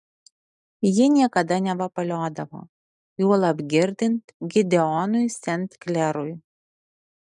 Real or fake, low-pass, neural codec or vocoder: real; 10.8 kHz; none